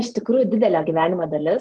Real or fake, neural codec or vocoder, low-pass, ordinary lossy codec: real; none; 9.9 kHz; Opus, 24 kbps